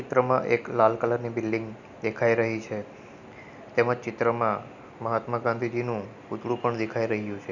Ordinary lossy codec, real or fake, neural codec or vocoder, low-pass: none; real; none; 7.2 kHz